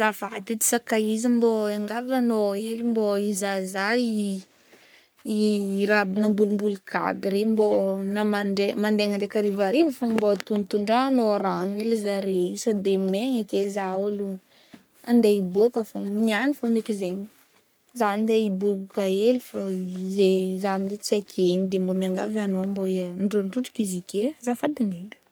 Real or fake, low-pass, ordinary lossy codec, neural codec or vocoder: fake; none; none; codec, 44.1 kHz, 3.4 kbps, Pupu-Codec